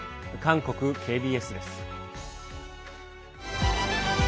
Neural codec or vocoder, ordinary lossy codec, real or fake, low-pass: none; none; real; none